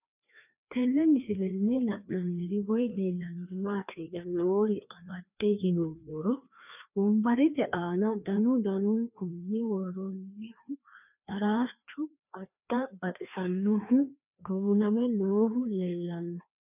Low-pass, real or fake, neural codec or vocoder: 3.6 kHz; fake; codec, 16 kHz, 2 kbps, FreqCodec, larger model